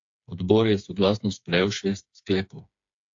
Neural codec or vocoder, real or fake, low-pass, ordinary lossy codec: codec, 16 kHz, 4 kbps, FreqCodec, smaller model; fake; 7.2 kHz; AAC, 48 kbps